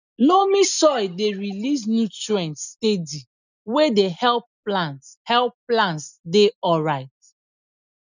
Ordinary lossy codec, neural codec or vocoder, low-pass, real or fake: none; none; 7.2 kHz; real